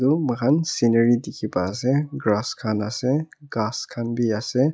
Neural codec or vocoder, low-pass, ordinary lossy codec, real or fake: none; none; none; real